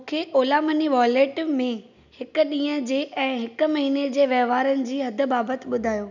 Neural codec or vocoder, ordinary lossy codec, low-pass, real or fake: none; none; 7.2 kHz; real